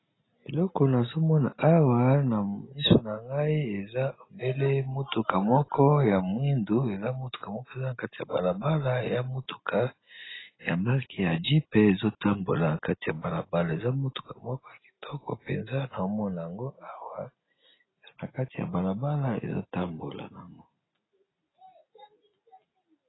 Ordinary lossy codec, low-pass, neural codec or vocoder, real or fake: AAC, 16 kbps; 7.2 kHz; none; real